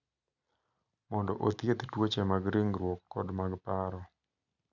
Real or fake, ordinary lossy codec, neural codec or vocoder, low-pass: real; none; none; 7.2 kHz